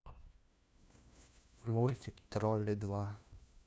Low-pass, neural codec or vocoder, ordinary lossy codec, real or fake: none; codec, 16 kHz, 1 kbps, FunCodec, trained on LibriTTS, 50 frames a second; none; fake